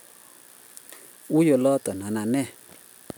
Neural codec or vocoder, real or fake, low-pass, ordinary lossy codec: none; real; none; none